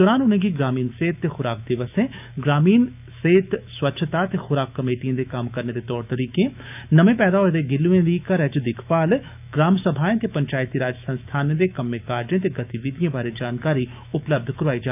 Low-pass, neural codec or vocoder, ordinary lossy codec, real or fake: 3.6 kHz; autoencoder, 48 kHz, 128 numbers a frame, DAC-VAE, trained on Japanese speech; none; fake